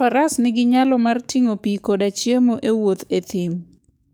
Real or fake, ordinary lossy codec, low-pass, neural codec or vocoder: fake; none; none; codec, 44.1 kHz, 7.8 kbps, DAC